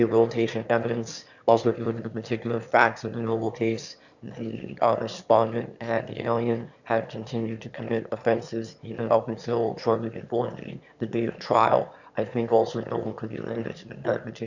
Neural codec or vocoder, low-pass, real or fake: autoencoder, 22.05 kHz, a latent of 192 numbers a frame, VITS, trained on one speaker; 7.2 kHz; fake